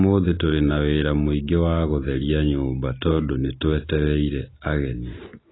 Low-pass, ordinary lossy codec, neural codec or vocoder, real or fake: 7.2 kHz; AAC, 16 kbps; none; real